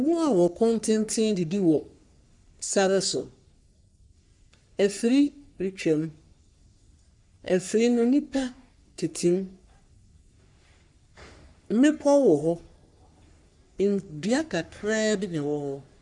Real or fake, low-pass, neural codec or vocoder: fake; 10.8 kHz; codec, 44.1 kHz, 3.4 kbps, Pupu-Codec